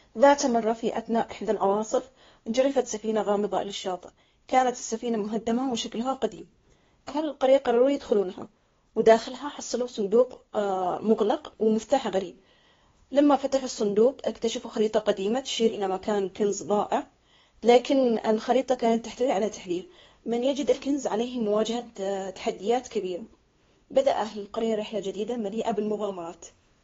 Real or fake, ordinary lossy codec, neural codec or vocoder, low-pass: fake; AAC, 24 kbps; codec, 16 kHz, 2 kbps, FunCodec, trained on LibriTTS, 25 frames a second; 7.2 kHz